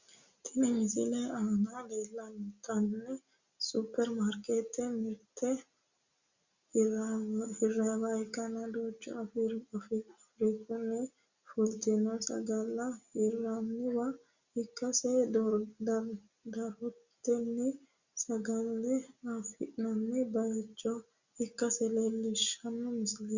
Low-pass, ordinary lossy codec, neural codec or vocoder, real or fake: 7.2 kHz; Opus, 64 kbps; none; real